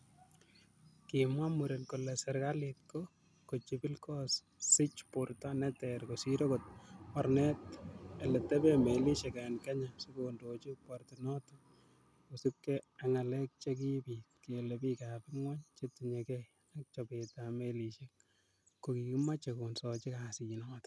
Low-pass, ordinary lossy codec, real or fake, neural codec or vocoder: none; none; real; none